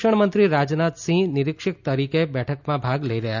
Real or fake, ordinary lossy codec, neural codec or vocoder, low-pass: real; none; none; 7.2 kHz